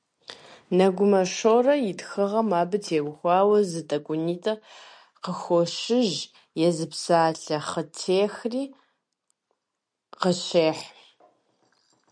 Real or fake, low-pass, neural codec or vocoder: real; 9.9 kHz; none